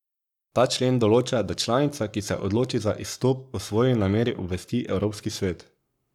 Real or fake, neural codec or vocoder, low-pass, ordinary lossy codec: fake; codec, 44.1 kHz, 7.8 kbps, Pupu-Codec; 19.8 kHz; none